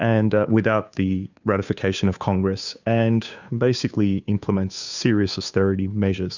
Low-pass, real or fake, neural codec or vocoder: 7.2 kHz; fake; codec, 16 kHz, 2 kbps, FunCodec, trained on Chinese and English, 25 frames a second